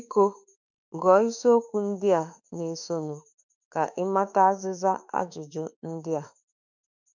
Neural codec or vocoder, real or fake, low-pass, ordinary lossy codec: autoencoder, 48 kHz, 32 numbers a frame, DAC-VAE, trained on Japanese speech; fake; 7.2 kHz; none